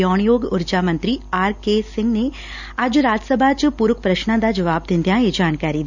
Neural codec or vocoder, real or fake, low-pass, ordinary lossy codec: none; real; 7.2 kHz; none